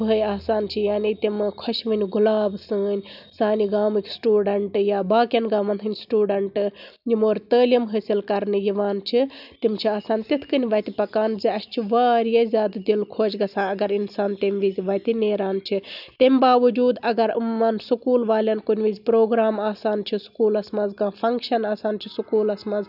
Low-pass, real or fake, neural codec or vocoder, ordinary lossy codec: 5.4 kHz; real; none; none